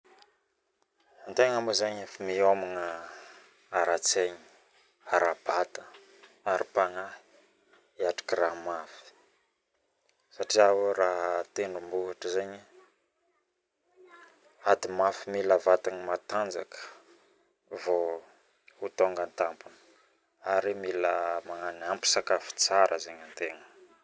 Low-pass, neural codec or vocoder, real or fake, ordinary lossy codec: none; none; real; none